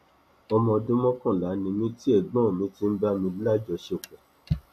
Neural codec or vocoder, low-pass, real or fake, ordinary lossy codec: none; 14.4 kHz; real; none